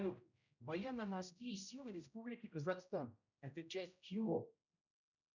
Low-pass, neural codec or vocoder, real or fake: 7.2 kHz; codec, 16 kHz, 0.5 kbps, X-Codec, HuBERT features, trained on general audio; fake